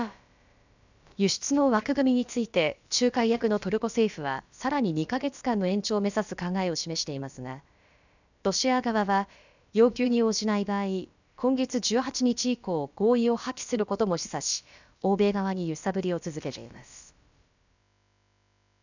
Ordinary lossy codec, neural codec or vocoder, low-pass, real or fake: none; codec, 16 kHz, about 1 kbps, DyCAST, with the encoder's durations; 7.2 kHz; fake